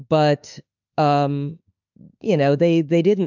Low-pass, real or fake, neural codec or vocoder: 7.2 kHz; fake; autoencoder, 48 kHz, 32 numbers a frame, DAC-VAE, trained on Japanese speech